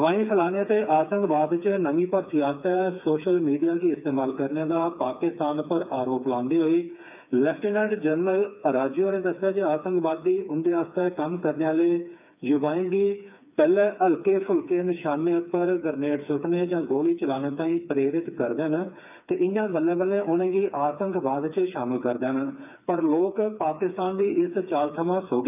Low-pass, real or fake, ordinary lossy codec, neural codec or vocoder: 3.6 kHz; fake; none; codec, 16 kHz, 4 kbps, FreqCodec, smaller model